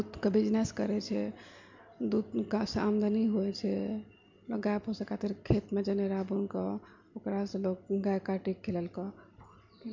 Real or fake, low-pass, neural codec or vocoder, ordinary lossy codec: real; 7.2 kHz; none; MP3, 64 kbps